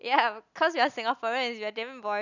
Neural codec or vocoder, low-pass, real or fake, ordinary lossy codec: none; 7.2 kHz; real; none